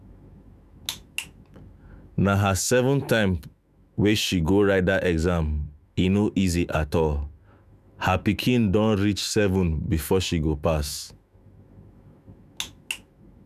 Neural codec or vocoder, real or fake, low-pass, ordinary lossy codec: autoencoder, 48 kHz, 128 numbers a frame, DAC-VAE, trained on Japanese speech; fake; 14.4 kHz; none